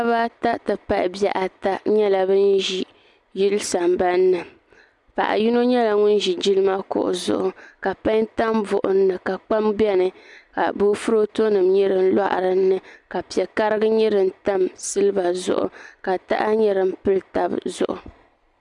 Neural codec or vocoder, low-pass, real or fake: none; 10.8 kHz; real